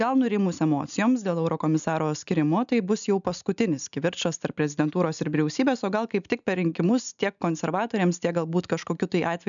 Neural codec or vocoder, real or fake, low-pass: none; real; 7.2 kHz